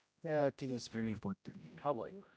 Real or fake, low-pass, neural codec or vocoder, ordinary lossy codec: fake; none; codec, 16 kHz, 0.5 kbps, X-Codec, HuBERT features, trained on general audio; none